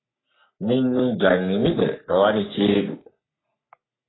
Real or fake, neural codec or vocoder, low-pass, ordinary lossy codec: fake; codec, 44.1 kHz, 3.4 kbps, Pupu-Codec; 7.2 kHz; AAC, 16 kbps